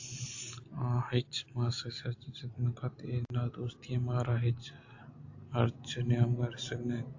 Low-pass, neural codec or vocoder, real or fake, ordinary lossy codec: 7.2 kHz; none; real; AAC, 48 kbps